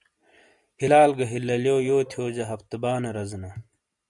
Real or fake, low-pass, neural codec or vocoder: real; 10.8 kHz; none